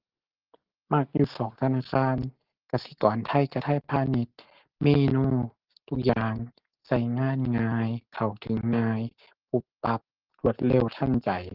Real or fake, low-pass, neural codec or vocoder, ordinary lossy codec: real; 5.4 kHz; none; Opus, 16 kbps